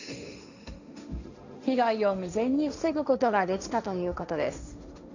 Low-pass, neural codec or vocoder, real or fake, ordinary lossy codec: 7.2 kHz; codec, 16 kHz, 1.1 kbps, Voila-Tokenizer; fake; none